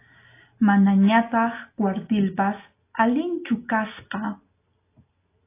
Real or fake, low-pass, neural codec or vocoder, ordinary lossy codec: real; 3.6 kHz; none; AAC, 24 kbps